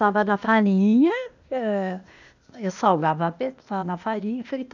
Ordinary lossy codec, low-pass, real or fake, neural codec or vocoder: none; 7.2 kHz; fake; codec, 16 kHz, 0.8 kbps, ZipCodec